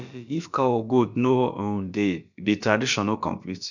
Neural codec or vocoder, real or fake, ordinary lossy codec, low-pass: codec, 16 kHz, about 1 kbps, DyCAST, with the encoder's durations; fake; none; 7.2 kHz